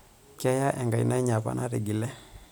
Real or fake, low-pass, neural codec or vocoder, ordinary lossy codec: real; none; none; none